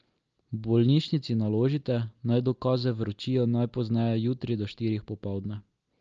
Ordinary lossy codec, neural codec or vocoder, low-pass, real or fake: Opus, 24 kbps; none; 7.2 kHz; real